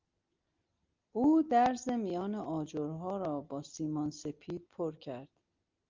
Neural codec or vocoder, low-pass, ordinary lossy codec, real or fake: none; 7.2 kHz; Opus, 24 kbps; real